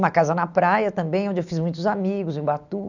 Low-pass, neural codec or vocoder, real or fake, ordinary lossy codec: 7.2 kHz; none; real; none